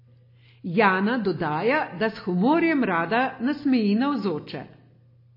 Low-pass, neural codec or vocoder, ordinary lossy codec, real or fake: 5.4 kHz; none; MP3, 24 kbps; real